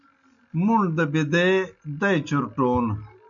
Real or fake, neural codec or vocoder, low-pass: real; none; 7.2 kHz